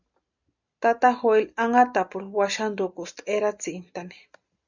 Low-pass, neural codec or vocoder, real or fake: 7.2 kHz; none; real